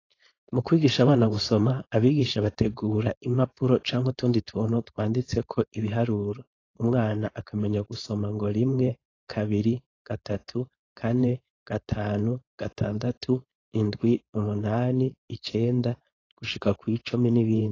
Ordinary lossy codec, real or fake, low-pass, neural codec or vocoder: AAC, 32 kbps; fake; 7.2 kHz; codec, 16 kHz, 4.8 kbps, FACodec